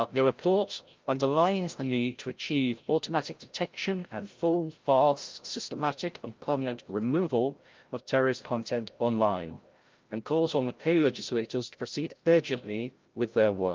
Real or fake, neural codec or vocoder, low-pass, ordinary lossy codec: fake; codec, 16 kHz, 0.5 kbps, FreqCodec, larger model; 7.2 kHz; Opus, 32 kbps